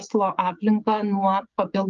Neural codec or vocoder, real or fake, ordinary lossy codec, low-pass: codec, 16 kHz, 16 kbps, FreqCodec, smaller model; fake; Opus, 32 kbps; 7.2 kHz